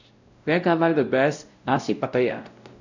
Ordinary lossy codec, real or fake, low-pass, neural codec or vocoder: none; fake; 7.2 kHz; codec, 16 kHz, 0.5 kbps, X-Codec, WavLM features, trained on Multilingual LibriSpeech